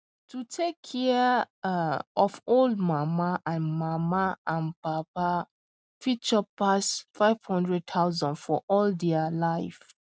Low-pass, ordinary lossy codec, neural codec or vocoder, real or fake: none; none; none; real